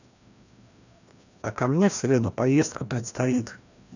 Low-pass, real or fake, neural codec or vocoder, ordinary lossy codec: 7.2 kHz; fake; codec, 16 kHz, 1 kbps, FreqCodec, larger model; none